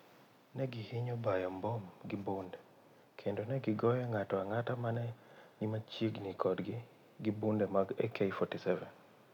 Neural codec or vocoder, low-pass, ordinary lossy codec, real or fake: none; 19.8 kHz; none; real